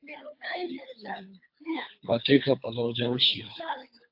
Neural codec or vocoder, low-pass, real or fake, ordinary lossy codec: codec, 24 kHz, 3 kbps, HILCodec; 5.4 kHz; fake; AAC, 48 kbps